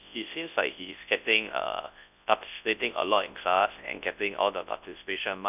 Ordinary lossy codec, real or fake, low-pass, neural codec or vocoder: none; fake; 3.6 kHz; codec, 24 kHz, 0.9 kbps, WavTokenizer, large speech release